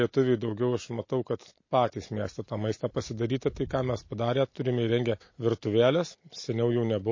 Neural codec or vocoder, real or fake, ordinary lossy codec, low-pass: none; real; MP3, 32 kbps; 7.2 kHz